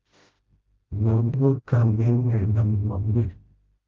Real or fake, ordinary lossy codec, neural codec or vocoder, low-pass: fake; Opus, 32 kbps; codec, 16 kHz, 0.5 kbps, FreqCodec, smaller model; 7.2 kHz